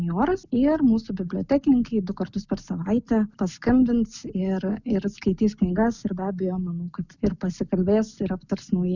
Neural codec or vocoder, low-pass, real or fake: none; 7.2 kHz; real